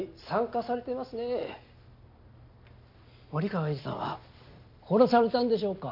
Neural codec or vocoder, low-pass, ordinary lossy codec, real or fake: none; 5.4 kHz; none; real